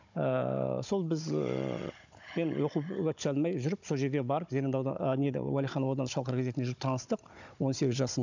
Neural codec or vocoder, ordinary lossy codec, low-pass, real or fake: codec, 16 kHz, 16 kbps, FunCodec, trained on Chinese and English, 50 frames a second; none; 7.2 kHz; fake